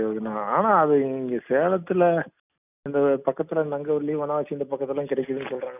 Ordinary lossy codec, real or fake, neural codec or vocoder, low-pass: none; real; none; 3.6 kHz